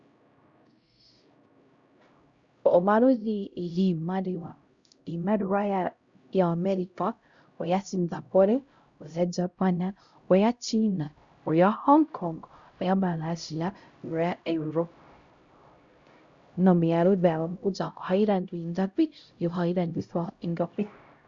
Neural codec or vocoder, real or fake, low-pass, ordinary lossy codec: codec, 16 kHz, 0.5 kbps, X-Codec, HuBERT features, trained on LibriSpeech; fake; 7.2 kHz; Opus, 64 kbps